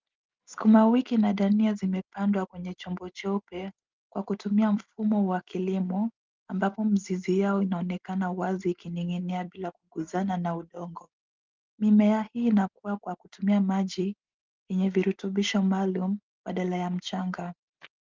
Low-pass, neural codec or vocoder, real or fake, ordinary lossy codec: 7.2 kHz; none; real; Opus, 32 kbps